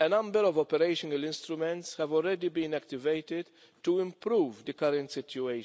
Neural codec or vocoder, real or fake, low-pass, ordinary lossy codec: none; real; none; none